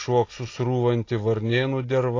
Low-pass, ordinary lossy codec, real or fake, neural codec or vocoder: 7.2 kHz; AAC, 32 kbps; real; none